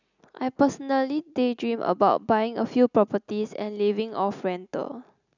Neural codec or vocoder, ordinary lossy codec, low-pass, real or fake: none; none; 7.2 kHz; real